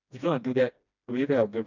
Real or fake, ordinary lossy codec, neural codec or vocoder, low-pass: fake; none; codec, 16 kHz, 1 kbps, FreqCodec, smaller model; 7.2 kHz